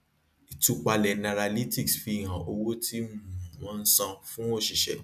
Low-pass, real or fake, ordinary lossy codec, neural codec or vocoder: 14.4 kHz; real; none; none